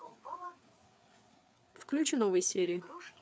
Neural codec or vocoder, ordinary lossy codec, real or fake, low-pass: codec, 16 kHz, 4 kbps, FreqCodec, larger model; none; fake; none